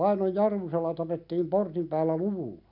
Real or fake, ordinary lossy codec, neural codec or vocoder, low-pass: real; none; none; 5.4 kHz